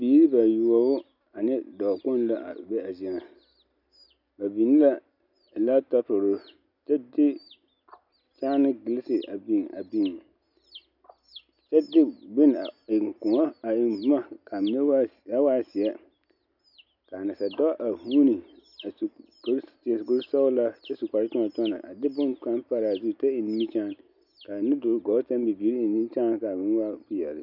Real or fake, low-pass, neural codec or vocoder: real; 5.4 kHz; none